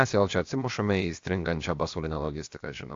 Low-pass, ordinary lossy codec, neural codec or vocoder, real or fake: 7.2 kHz; AAC, 48 kbps; codec, 16 kHz, about 1 kbps, DyCAST, with the encoder's durations; fake